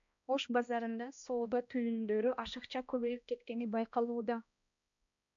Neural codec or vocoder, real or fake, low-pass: codec, 16 kHz, 1 kbps, X-Codec, HuBERT features, trained on balanced general audio; fake; 7.2 kHz